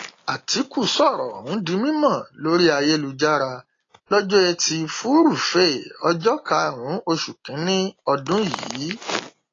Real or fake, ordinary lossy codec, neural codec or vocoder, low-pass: real; AAC, 32 kbps; none; 7.2 kHz